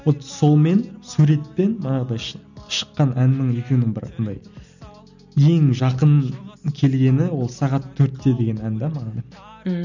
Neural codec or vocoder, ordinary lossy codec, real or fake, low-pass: none; none; real; 7.2 kHz